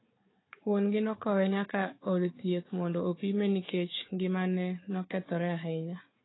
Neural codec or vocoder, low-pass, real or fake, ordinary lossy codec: codec, 16 kHz, 4 kbps, FunCodec, trained on Chinese and English, 50 frames a second; 7.2 kHz; fake; AAC, 16 kbps